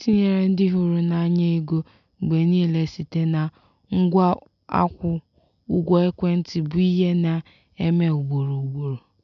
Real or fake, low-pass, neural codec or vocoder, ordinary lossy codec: real; 7.2 kHz; none; MP3, 64 kbps